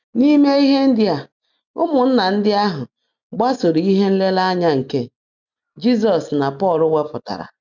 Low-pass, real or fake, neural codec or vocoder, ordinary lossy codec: 7.2 kHz; real; none; none